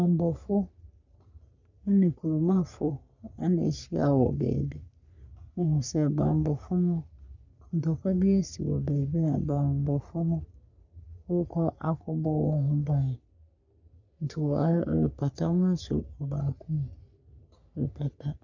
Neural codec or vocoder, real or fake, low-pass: codec, 44.1 kHz, 3.4 kbps, Pupu-Codec; fake; 7.2 kHz